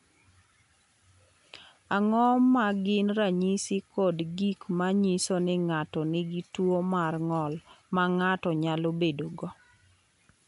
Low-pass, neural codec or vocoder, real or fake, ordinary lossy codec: 10.8 kHz; none; real; none